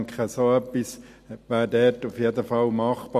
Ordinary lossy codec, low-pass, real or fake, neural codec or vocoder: MP3, 64 kbps; 14.4 kHz; real; none